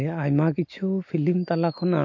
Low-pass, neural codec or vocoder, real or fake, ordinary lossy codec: 7.2 kHz; none; real; MP3, 48 kbps